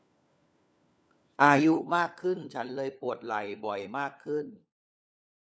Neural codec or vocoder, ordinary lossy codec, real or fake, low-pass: codec, 16 kHz, 4 kbps, FunCodec, trained on LibriTTS, 50 frames a second; none; fake; none